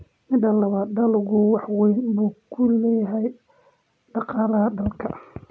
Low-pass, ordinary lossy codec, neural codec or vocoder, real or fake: none; none; none; real